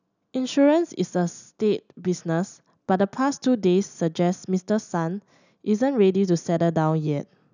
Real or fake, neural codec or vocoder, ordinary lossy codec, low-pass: real; none; none; 7.2 kHz